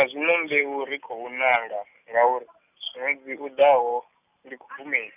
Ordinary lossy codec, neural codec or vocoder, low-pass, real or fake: none; none; 3.6 kHz; real